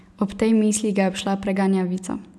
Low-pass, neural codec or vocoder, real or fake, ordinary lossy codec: none; none; real; none